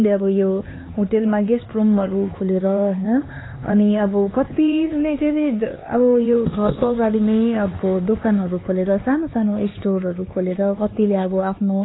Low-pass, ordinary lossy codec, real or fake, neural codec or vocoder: 7.2 kHz; AAC, 16 kbps; fake; codec, 16 kHz, 4 kbps, X-Codec, HuBERT features, trained on LibriSpeech